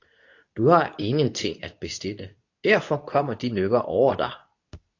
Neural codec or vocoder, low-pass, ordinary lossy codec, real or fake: codec, 24 kHz, 0.9 kbps, WavTokenizer, medium speech release version 2; 7.2 kHz; AAC, 48 kbps; fake